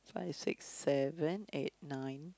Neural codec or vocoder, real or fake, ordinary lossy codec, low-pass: none; real; none; none